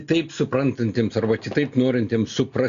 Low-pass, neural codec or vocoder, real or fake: 7.2 kHz; none; real